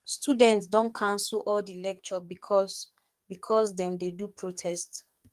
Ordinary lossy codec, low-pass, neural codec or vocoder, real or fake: Opus, 32 kbps; 14.4 kHz; codec, 44.1 kHz, 2.6 kbps, SNAC; fake